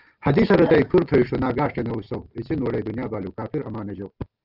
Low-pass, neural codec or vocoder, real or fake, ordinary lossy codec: 5.4 kHz; none; real; Opus, 32 kbps